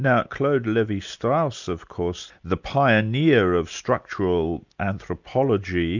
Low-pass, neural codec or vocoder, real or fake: 7.2 kHz; none; real